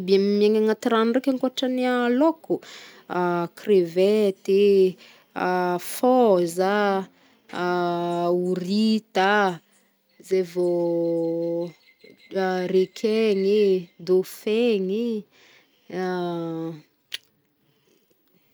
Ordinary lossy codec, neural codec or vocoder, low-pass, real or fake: none; none; none; real